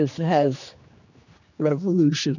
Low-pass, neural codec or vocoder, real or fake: 7.2 kHz; codec, 16 kHz, 2 kbps, X-Codec, HuBERT features, trained on general audio; fake